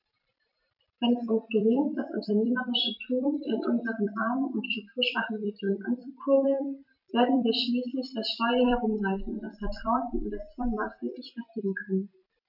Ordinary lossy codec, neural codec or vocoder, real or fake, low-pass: none; vocoder, 44.1 kHz, 128 mel bands every 256 samples, BigVGAN v2; fake; 5.4 kHz